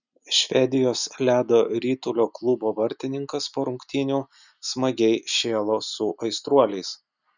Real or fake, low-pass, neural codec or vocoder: real; 7.2 kHz; none